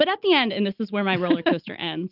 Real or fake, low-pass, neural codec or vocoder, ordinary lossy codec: real; 5.4 kHz; none; Opus, 24 kbps